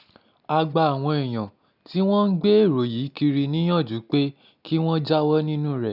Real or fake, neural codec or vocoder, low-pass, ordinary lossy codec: real; none; 5.4 kHz; none